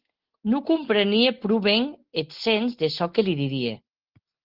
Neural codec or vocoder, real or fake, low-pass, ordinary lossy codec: none; real; 5.4 kHz; Opus, 16 kbps